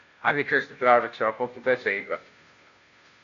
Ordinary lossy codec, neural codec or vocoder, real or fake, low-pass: AAC, 48 kbps; codec, 16 kHz, 0.5 kbps, FunCodec, trained on Chinese and English, 25 frames a second; fake; 7.2 kHz